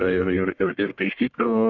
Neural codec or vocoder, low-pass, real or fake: codec, 44.1 kHz, 1.7 kbps, Pupu-Codec; 7.2 kHz; fake